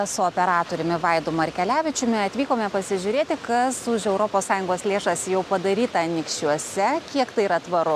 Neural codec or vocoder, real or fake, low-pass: none; real; 14.4 kHz